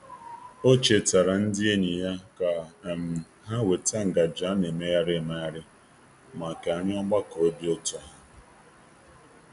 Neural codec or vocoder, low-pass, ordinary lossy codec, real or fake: none; 10.8 kHz; none; real